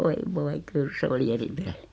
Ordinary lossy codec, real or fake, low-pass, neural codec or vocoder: none; real; none; none